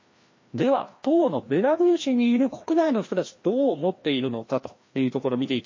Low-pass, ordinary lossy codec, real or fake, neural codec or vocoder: 7.2 kHz; MP3, 32 kbps; fake; codec, 16 kHz, 1 kbps, FreqCodec, larger model